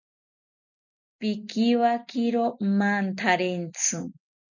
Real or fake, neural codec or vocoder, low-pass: real; none; 7.2 kHz